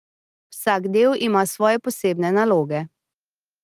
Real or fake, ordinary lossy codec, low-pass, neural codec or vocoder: real; Opus, 24 kbps; 14.4 kHz; none